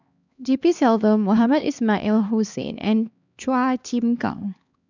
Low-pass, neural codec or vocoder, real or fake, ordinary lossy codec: 7.2 kHz; codec, 16 kHz, 2 kbps, X-Codec, HuBERT features, trained on LibriSpeech; fake; none